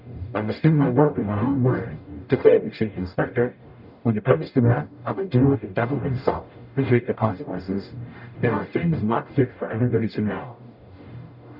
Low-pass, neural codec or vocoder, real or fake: 5.4 kHz; codec, 44.1 kHz, 0.9 kbps, DAC; fake